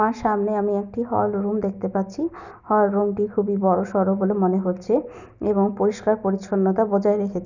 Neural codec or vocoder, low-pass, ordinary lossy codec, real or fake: none; 7.2 kHz; none; real